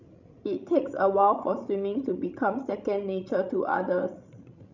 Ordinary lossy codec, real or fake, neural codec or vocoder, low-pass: none; fake; codec, 16 kHz, 16 kbps, FreqCodec, larger model; 7.2 kHz